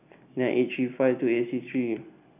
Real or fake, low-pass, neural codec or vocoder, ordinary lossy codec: real; 3.6 kHz; none; none